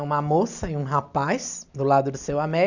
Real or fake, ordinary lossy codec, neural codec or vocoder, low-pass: real; none; none; 7.2 kHz